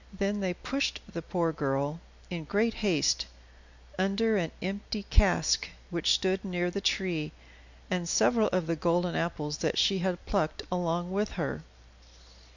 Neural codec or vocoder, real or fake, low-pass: none; real; 7.2 kHz